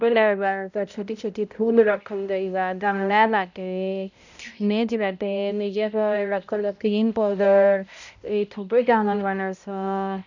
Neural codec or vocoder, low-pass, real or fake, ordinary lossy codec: codec, 16 kHz, 0.5 kbps, X-Codec, HuBERT features, trained on balanced general audio; 7.2 kHz; fake; none